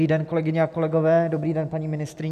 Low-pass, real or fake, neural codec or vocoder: 14.4 kHz; real; none